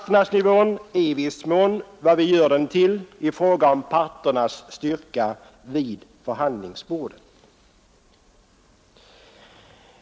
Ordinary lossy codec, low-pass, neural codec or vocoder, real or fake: none; none; none; real